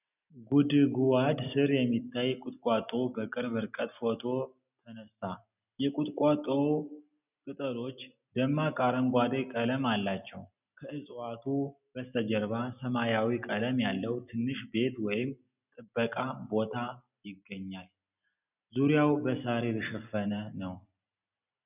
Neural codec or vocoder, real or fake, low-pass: none; real; 3.6 kHz